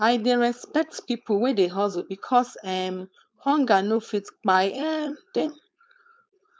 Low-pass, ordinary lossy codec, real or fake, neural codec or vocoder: none; none; fake; codec, 16 kHz, 4.8 kbps, FACodec